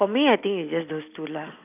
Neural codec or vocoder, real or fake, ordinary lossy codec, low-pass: vocoder, 44.1 kHz, 128 mel bands every 256 samples, BigVGAN v2; fake; AAC, 32 kbps; 3.6 kHz